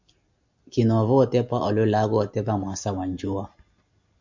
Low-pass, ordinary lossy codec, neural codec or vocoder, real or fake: 7.2 kHz; MP3, 64 kbps; none; real